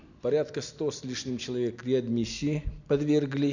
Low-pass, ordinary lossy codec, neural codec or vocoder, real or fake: 7.2 kHz; none; none; real